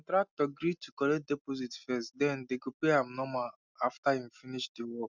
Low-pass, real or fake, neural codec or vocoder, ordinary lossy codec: 7.2 kHz; real; none; none